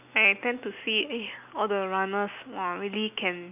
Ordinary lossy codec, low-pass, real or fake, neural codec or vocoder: none; 3.6 kHz; real; none